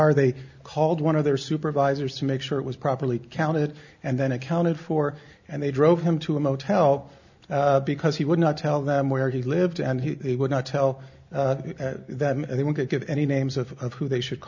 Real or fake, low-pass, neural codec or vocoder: real; 7.2 kHz; none